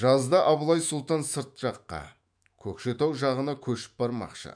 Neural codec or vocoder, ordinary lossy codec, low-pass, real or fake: autoencoder, 48 kHz, 128 numbers a frame, DAC-VAE, trained on Japanese speech; none; 9.9 kHz; fake